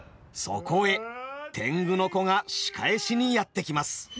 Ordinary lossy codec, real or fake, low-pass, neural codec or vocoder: none; real; none; none